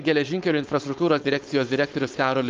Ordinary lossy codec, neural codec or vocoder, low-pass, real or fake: Opus, 32 kbps; codec, 16 kHz, 4.8 kbps, FACodec; 7.2 kHz; fake